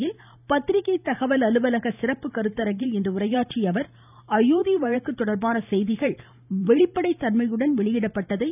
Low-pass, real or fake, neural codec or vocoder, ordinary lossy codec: 3.6 kHz; fake; vocoder, 44.1 kHz, 128 mel bands every 512 samples, BigVGAN v2; none